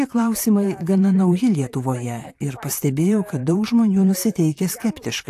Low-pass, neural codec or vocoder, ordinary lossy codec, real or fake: 14.4 kHz; vocoder, 44.1 kHz, 128 mel bands, Pupu-Vocoder; AAC, 64 kbps; fake